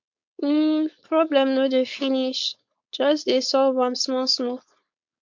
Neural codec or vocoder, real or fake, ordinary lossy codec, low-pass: codec, 16 kHz, 4.8 kbps, FACodec; fake; MP3, 48 kbps; 7.2 kHz